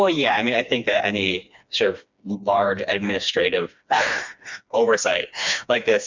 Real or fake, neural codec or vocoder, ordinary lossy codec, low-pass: fake; codec, 16 kHz, 2 kbps, FreqCodec, smaller model; MP3, 64 kbps; 7.2 kHz